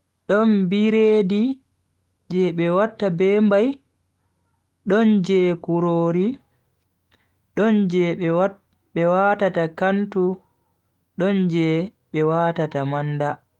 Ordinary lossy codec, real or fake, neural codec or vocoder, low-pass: Opus, 24 kbps; fake; autoencoder, 48 kHz, 128 numbers a frame, DAC-VAE, trained on Japanese speech; 19.8 kHz